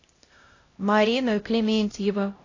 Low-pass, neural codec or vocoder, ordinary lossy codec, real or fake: 7.2 kHz; codec, 16 kHz, 0.5 kbps, X-Codec, HuBERT features, trained on LibriSpeech; AAC, 32 kbps; fake